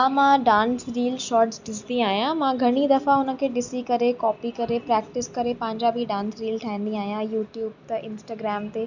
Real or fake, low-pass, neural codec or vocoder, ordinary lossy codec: real; 7.2 kHz; none; none